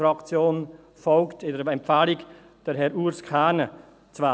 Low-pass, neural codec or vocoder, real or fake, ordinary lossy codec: none; none; real; none